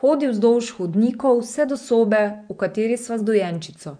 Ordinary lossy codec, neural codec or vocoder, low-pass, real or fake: none; vocoder, 24 kHz, 100 mel bands, Vocos; 9.9 kHz; fake